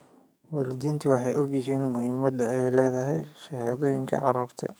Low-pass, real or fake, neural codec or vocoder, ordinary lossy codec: none; fake; codec, 44.1 kHz, 2.6 kbps, SNAC; none